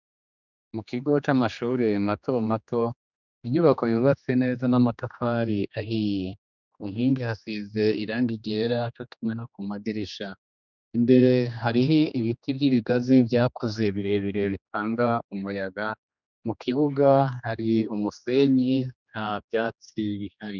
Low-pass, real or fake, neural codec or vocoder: 7.2 kHz; fake; codec, 16 kHz, 2 kbps, X-Codec, HuBERT features, trained on general audio